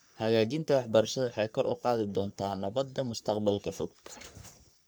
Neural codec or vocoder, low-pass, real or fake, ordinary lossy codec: codec, 44.1 kHz, 3.4 kbps, Pupu-Codec; none; fake; none